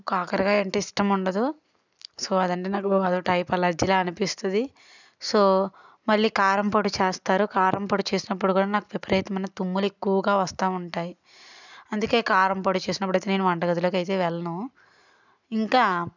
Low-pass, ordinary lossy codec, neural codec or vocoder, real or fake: 7.2 kHz; none; none; real